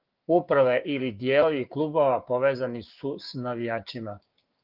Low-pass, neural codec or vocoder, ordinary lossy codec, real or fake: 5.4 kHz; codec, 16 kHz, 6 kbps, DAC; Opus, 32 kbps; fake